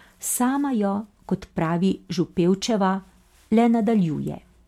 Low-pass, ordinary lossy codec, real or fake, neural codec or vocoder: 19.8 kHz; MP3, 96 kbps; real; none